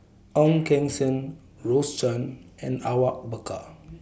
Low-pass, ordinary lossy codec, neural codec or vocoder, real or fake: none; none; none; real